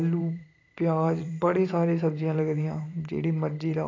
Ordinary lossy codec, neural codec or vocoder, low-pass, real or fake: AAC, 32 kbps; vocoder, 44.1 kHz, 128 mel bands every 512 samples, BigVGAN v2; 7.2 kHz; fake